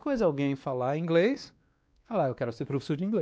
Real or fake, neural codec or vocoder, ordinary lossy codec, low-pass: fake; codec, 16 kHz, 2 kbps, X-Codec, WavLM features, trained on Multilingual LibriSpeech; none; none